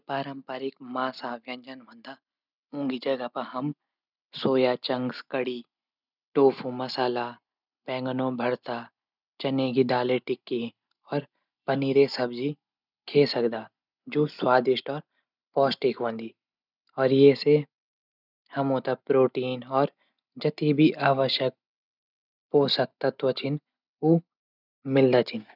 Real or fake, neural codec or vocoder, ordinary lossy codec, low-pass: real; none; none; 5.4 kHz